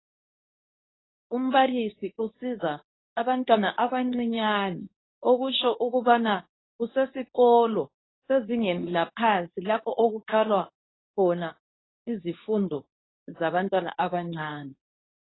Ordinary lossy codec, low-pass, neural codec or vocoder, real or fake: AAC, 16 kbps; 7.2 kHz; codec, 24 kHz, 0.9 kbps, WavTokenizer, medium speech release version 2; fake